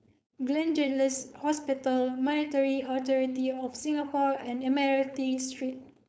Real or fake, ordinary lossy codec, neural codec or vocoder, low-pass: fake; none; codec, 16 kHz, 4.8 kbps, FACodec; none